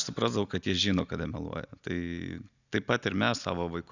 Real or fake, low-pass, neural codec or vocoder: real; 7.2 kHz; none